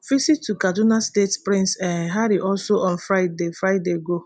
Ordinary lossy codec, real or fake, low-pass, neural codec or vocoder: none; real; 9.9 kHz; none